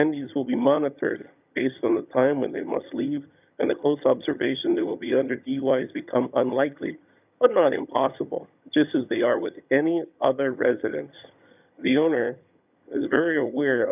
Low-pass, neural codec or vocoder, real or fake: 3.6 kHz; vocoder, 22.05 kHz, 80 mel bands, HiFi-GAN; fake